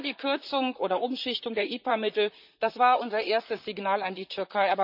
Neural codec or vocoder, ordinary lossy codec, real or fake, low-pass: vocoder, 44.1 kHz, 128 mel bands, Pupu-Vocoder; none; fake; 5.4 kHz